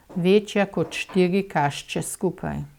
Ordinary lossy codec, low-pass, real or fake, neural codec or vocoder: none; 19.8 kHz; real; none